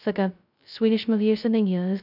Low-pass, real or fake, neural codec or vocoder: 5.4 kHz; fake; codec, 16 kHz, 0.2 kbps, FocalCodec